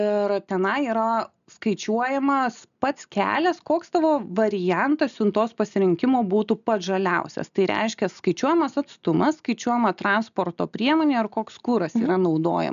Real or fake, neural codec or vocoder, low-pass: real; none; 7.2 kHz